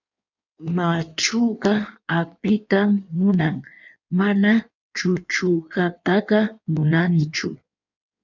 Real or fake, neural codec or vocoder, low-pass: fake; codec, 16 kHz in and 24 kHz out, 1.1 kbps, FireRedTTS-2 codec; 7.2 kHz